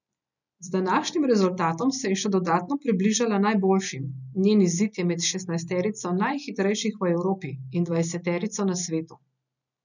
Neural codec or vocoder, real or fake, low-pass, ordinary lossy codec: none; real; 7.2 kHz; none